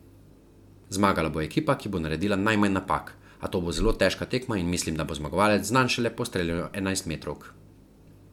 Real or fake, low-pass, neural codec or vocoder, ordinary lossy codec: real; 19.8 kHz; none; MP3, 96 kbps